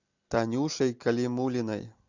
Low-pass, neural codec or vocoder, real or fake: 7.2 kHz; none; real